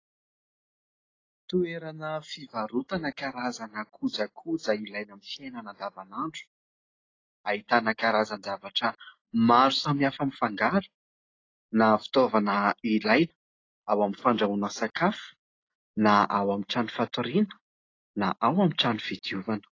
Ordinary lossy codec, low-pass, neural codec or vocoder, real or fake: AAC, 32 kbps; 7.2 kHz; none; real